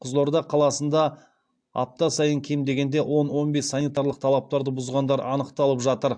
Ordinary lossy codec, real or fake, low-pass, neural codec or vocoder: AAC, 64 kbps; real; 9.9 kHz; none